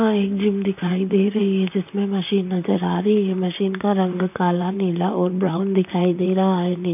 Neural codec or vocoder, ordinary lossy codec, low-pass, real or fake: vocoder, 44.1 kHz, 128 mel bands, Pupu-Vocoder; none; 3.6 kHz; fake